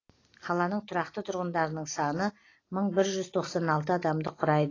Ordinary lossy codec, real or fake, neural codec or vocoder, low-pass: AAC, 32 kbps; real; none; 7.2 kHz